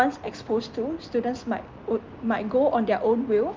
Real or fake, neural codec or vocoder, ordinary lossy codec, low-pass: real; none; Opus, 24 kbps; 7.2 kHz